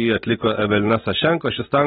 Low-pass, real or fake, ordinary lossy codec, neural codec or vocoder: 7.2 kHz; real; AAC, 16 kbps; none